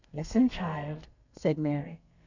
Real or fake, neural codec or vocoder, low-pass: fake; codec, 32 kHz, 1.9 kbps, SNAC; 7.2 kHz